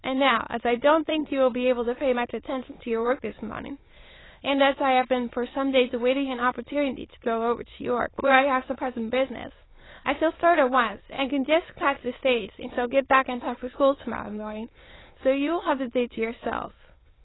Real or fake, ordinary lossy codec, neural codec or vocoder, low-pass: fake; AAC, 16 kbps; autoencoder, 22.05 kHz, a latent of 192 numbers a frame, VITS, trained on many speakers; 7.2 kHz